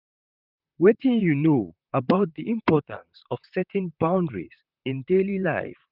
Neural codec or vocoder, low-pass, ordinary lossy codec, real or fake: vocoder, 44.1 kHz, 128 mel bands, Pupu-Vocoder; 5.4 kHz; none; fake